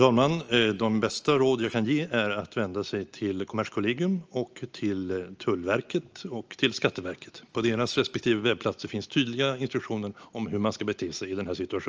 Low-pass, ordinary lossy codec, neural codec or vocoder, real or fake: 7.2 kHz; Opus, 24 kbps; none; real